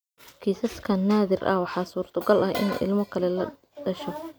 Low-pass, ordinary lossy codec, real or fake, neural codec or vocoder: none; none; real; none